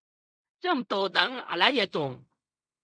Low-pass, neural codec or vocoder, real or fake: 9.9 kHz; codec, 16 kHz in and 24 kHz out, 0.4 kbps, LongCat-Audio-Codec, fine tuned four codebook decoder; fake